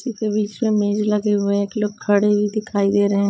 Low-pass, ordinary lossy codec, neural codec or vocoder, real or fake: none; none; codec, 16 kHz, 16 kbps, FreqCodec, larger model; fake